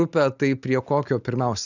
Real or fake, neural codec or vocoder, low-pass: real; none; 7.2 kHz